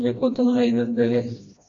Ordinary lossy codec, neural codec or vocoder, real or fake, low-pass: MP3, 48 kbps; codec, 16 kHz, 1 kbps, FreqCodec, smaller model; fake; 7.2 kHz